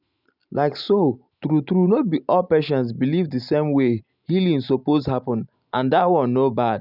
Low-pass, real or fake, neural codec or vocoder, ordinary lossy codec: 5.4 kHz; real; none; none